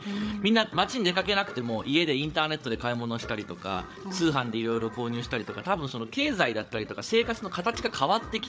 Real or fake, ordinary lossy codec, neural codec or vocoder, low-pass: fake; none; codec, 16 kHz, 8 kbps, FreqCodec, larger model; none